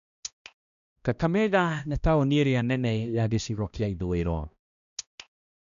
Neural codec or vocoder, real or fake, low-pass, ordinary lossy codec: codec, 16 kHz, 1 kbps, X-Codec, HuBERT features, trained on balanced general audio; fake; 7.2 kHz; none